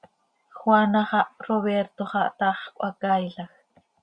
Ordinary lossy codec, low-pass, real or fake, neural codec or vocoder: Opus, 64 kbps; 9.9 kHz; real; none